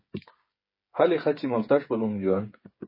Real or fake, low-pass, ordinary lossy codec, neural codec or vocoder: fake; 5.4 kHz; MP3, 24 kbps; codec, 16 kHz, 8 kbps, FreqCodec, smaller model